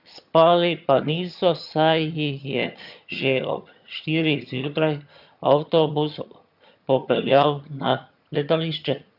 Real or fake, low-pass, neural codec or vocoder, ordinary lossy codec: fake; 5.4 kHz; vocoder, 22.05 kHz, 80 mel bands, HiFi-GAN; none